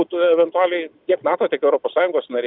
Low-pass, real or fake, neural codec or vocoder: 5.4 kHz; real; none